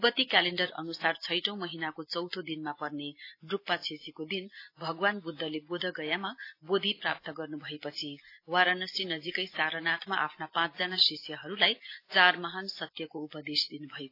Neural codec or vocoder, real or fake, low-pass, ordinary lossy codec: none; real; 5.4 kHz; AAC, 32 kbps